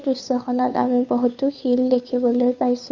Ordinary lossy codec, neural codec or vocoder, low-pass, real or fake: none; codec, 16 kHz, 2 kbps, FunCodec, trained on Chinese and English, 25 frames a second; 7.2 kHz; fake